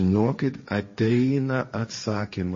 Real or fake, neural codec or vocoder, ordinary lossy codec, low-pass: fake; codec, 16 kHz, 1.1 kbps, Voila-Tokenizer; MP3, 32 kbps; 7.2 kHz